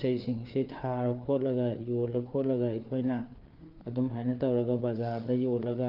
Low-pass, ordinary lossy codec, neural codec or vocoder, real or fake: 5.4 kHz; Opus, 24 kbps; codec, 16 kHz, 4 kbps, FreqCodec, larger model; fake